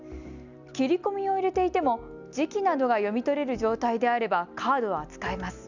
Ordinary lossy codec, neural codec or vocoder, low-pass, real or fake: none; none; 7.2 kHz; real